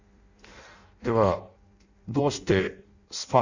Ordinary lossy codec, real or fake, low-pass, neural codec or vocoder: Opus, 64 kbps; fake; 7.2 kHz; codec, 16 kHz in and 24 kHz out, 0.6 kbps, FireRedTTS-2 codec